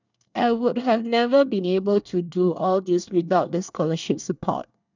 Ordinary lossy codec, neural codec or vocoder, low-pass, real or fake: none; codec, 24 kHz, 1 kbps, SNAC; 7.2 kHz; fake